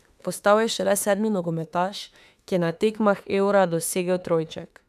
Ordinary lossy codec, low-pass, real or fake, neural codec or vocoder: none; 14.4 kHz; fake; autoencoder, 48 kHz, 32 numbers a frame, DAC-VAE, trained on Japanese speech